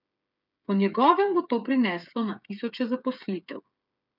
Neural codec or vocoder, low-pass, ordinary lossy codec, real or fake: codec, 16 kHz, 8 kbps, FreqCodec, smaller model; 5.4 kHz; none; fake